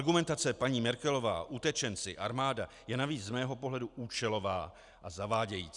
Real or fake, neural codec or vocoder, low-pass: real; none; 10.8 kHz